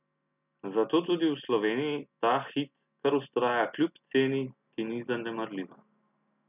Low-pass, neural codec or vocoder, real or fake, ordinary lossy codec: 3.6 kHz; none; real; none